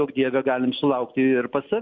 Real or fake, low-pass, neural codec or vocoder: real; 7.2 kHz; none